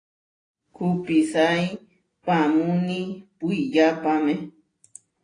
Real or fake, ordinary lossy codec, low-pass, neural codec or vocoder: real; AAC, 32 kbps; 9.9 kHz; none